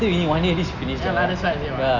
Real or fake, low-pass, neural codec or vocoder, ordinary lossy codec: real; 7.2 kHz; none; none